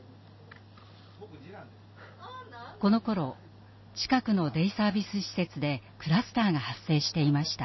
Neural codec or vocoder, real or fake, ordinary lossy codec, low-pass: none; real; MP3, 24 kbps; 7.2 kHz